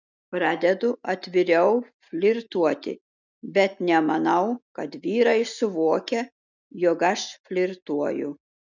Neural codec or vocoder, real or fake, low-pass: none; real; 7.2 kHz